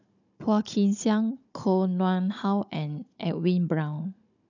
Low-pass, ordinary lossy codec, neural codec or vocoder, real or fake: 7.2 kHz; none; codec, 16 kHz, 16 kbps, FunCodec, trained on Chinese and English, 50 frames a second; fake